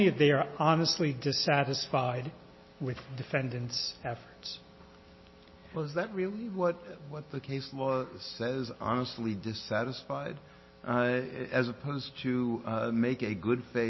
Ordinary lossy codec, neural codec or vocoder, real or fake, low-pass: MP3, 24 kbps; none; real; 7.2 kHz